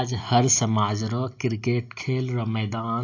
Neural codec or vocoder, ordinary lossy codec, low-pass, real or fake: none; AAC, 48 kbps; 7.2 kHz; real